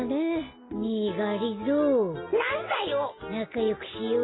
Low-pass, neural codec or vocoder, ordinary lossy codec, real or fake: 7.2 kHz; none; AAC, 16 kbps; real